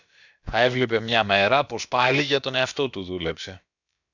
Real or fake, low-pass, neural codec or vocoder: fake; 7.2 kHz; codec, 16 kHz, about 1 kbps, DyCAST, with the encoder's durations